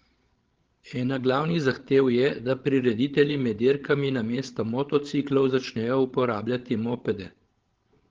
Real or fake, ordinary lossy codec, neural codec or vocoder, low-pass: fake; Opus, 16 kbps; codec, 16 kHz, 16 kbps, FreqCodec, larger model; 7.2 kHz